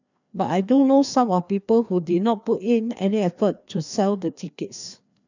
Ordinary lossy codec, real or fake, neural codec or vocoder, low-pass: none; fake; codec, 16 kHz, 2 kbps, FreqCodec, larger model; 7.2 kHz